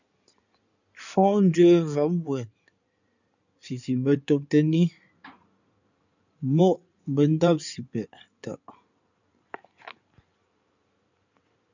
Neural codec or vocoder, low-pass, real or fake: codec, 16 kHz in and 24 kHz out, 2.2 kbps, FireRedTTS-2 codec; 7.2 kHz; fake